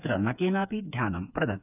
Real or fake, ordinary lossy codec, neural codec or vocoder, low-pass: fake; none; codec, 16 kHz, 4 kbps, FreqCodec, smaller model; 3.6 kHz